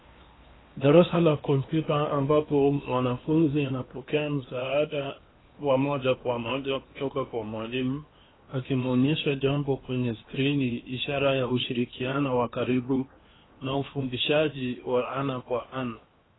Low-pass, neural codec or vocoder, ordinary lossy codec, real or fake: 7.2 kHz; codec, 16 kHz in and 24 kHz out, 0.8 kbps, FocalCodec, streaming, 65536 codes; AAC, 16 kbps; fake